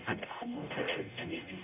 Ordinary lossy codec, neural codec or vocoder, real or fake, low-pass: none; codec, 44.1 kHz, 0.9 kbps, DAC; fake; 3.6 kHz